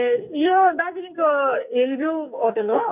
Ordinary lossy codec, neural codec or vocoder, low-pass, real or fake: none; codec, 44.1 kHz, 2.6 kbps, SNAC; 3.6 kHz; fake